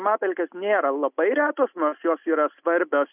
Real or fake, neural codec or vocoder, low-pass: real; none; 3.6 kHz